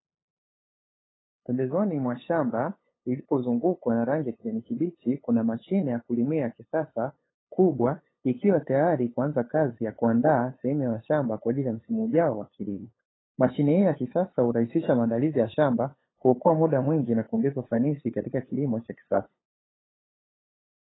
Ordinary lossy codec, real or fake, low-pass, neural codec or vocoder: AAC, 16 kbps; fake; 7.2 kHz; codec, 16 kHz, 8 kbps, FunCodec, trained on LibriTTS, 25 frames a second